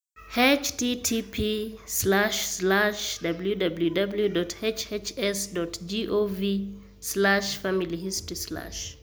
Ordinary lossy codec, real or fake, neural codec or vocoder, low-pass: none; real; none; none